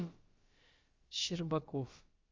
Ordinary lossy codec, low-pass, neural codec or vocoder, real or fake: Opus, 32 kbps; 7.2 kHz; codec, 16 kHz, about 1 kbps, DyCAST, with the encoder's durations; fake